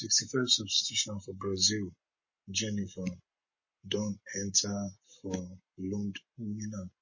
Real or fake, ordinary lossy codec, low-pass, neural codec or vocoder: fake; MP3, 32 kbps; 7.2 kHz; codec, 44.1 kHz, 7.8 kbps, Pupu-Codec